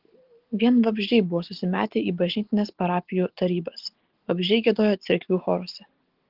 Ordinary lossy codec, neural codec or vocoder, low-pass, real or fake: Opus, 16 kbps; none; 5.4 kHz; real